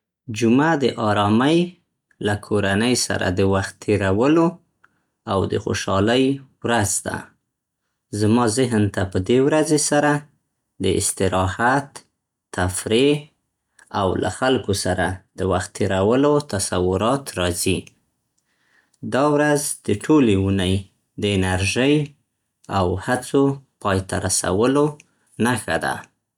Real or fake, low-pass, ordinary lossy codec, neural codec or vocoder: real; 19.8 kHz; none; none